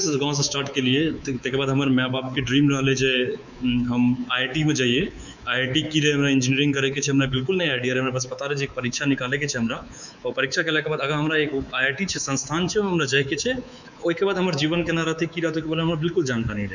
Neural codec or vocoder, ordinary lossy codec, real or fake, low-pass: codec, 24 kHz, 3.1 kbps, DualCodec; none; fake; 7.2 kHz